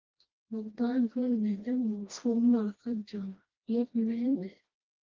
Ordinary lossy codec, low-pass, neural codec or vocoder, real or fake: Opus, 32 kbps; 7.2 kHz; codec, 16 kHz, 1 kbps, FreqCodec, smaller model; fake